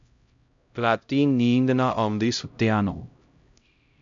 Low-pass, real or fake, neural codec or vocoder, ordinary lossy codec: 7.2 kHz; fake; codec, 16 kHz, 0.5 kbps, X-Codec, HuBERT features, trained on LibriSpeech; MP3, 64 kbps